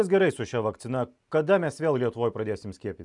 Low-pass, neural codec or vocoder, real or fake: 10.8 kHz; none; real